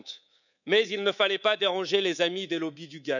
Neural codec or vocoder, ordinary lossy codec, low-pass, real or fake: codec, 24 kHz, 3.1 kbps, DualCodec; none; 7.2 kHz; fake